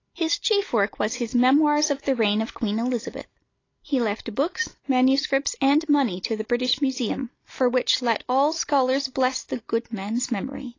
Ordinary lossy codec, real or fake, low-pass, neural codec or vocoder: AAC, 32 kbps; real; 7.2 kHz; none